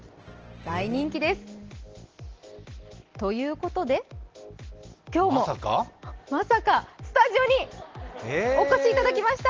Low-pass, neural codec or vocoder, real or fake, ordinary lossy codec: 7.2 kHz; none; real; Opus, 16 kbps